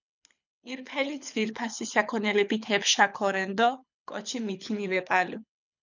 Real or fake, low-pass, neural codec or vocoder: fake; 7.2 kHz; codec, 24 kHz, 6 kbps, HILCodec